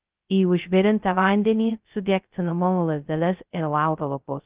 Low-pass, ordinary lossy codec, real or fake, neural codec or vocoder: 3.6 kHz; Opus, 32 kbps; fake; codec, 16 kHz, 0.2 kbps, FocalCodec